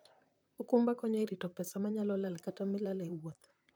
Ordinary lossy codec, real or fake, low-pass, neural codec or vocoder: none; fake; none; vocoder, 44.1 kHz, 128 mel bands, Pupu-Vocoder